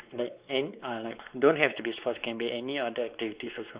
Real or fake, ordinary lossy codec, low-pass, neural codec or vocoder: fake; Opus, 64 kbps; 3.6 kHz; codec, 16 kHz, 4 kbps, X-Codec, WavLM features, trained on Multilingual LibriSpeech